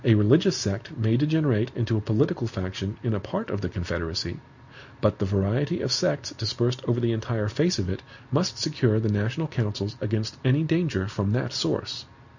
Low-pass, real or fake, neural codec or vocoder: 7.2 kHz; real; none